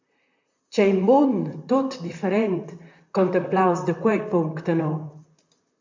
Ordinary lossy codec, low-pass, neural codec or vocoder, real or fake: MP3, 64 kbps; 7.2 kHz; vocoder, 44.1 kHz, 128 mel bands, Pupu-Vocoder; fake